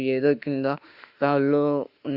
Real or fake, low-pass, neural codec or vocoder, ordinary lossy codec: fake; 5.4 kHz; autoencoder, 48 kHz, 32 numbers a frame, DAC-VAE, trained on Japanese speech; Opus, 64 kbps